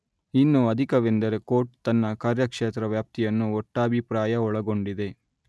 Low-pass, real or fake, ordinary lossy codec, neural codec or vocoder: none; real; none; none